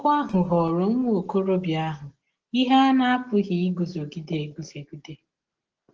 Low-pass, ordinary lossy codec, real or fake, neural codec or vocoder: 7.2 kHz; Opus, 16 kbps; real; none